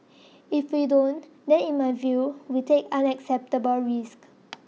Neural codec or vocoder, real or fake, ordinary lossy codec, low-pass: none; real; none; none